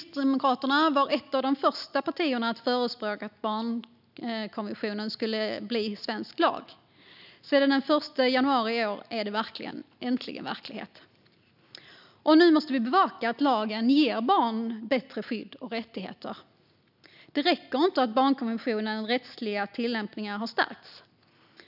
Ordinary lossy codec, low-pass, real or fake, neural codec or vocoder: none; 5.4 kHz; real; none